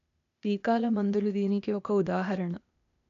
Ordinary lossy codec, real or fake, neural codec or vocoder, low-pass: none; fake; codec, 16 kHz, 0.8 kbps, ZipCodec; 7.2 kHz